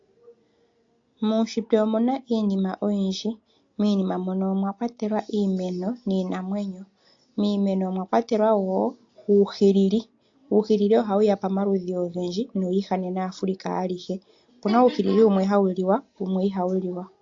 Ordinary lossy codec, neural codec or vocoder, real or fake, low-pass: AAC, 48 kbps; none; real; 7.2 kHz